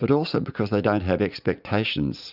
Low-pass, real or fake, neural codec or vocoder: 5.4 kHz; fake; vocoder, 44.1 kHz, 80 mel bands, Vocos